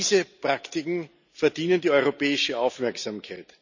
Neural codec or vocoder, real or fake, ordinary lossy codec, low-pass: none; real; none; 7.2 kHz